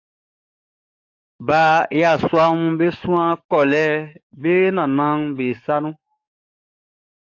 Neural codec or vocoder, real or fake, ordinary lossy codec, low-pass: codec, 44.1 kHz, 7.8 kbps, DAC; fake; AAC, 48 kbps; 7.2 kHz